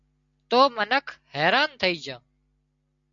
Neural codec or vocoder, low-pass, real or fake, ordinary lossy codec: none; 7.2 kHz; real; MP3, 64 kbps